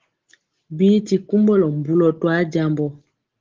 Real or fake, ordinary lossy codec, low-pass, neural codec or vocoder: real; Opus, 16 kbps; 7.2 kHz; none